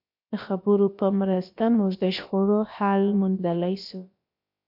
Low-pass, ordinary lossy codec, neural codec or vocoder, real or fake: 5.4 kHz; MP3, 48 kbps; codec, 16 kHz, about 1 kbps, DyCAST, with the encoder's durations; fake